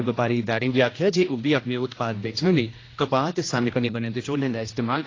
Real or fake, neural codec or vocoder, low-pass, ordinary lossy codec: fake; codec, 16 kHz, 1 kbps, X-Codec, HuBERT features, trained on general audio; 7.2 kHz; AAC, 32 kbps